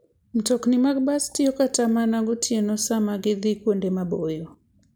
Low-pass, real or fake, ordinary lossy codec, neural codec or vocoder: none; real; none; none